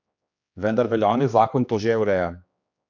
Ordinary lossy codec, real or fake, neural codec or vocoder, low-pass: none; fake; codec, 16 kHz, 2 kbps, X-Codec, HuBERT features, trained on general audio; 7.2 kHz